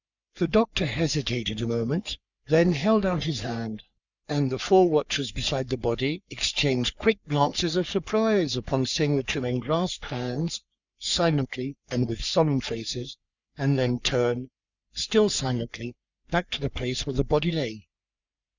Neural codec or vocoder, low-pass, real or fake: codec, 44.1 kHz, 3.4 kbps, Pupu-Codec; 7.2 kHz; fake